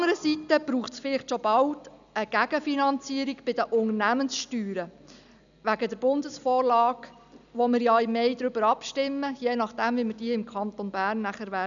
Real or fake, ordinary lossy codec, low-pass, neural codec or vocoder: real; none; 7.2 kHz; none